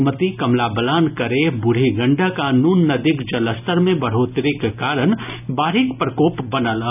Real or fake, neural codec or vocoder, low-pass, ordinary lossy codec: real; none; 3.6 kHz; none